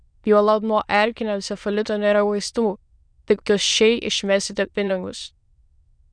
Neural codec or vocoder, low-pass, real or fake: autoencoder, 22.05 kHz, a latent of 192 numbers a frame, VITS, trained on many speakers; 9.9 kHz; fake